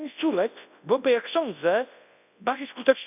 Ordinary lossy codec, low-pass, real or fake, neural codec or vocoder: none; 3.6 kHz; fake; codec, 24 kHz, 0.9 kbps, WavTokenizer, large speech release